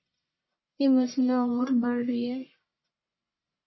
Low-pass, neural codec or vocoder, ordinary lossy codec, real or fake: 7.2 kHz; codec, 44.1 kHz, 1.7 kbps, Pupu-Codec; MP3, 24 kbps; fake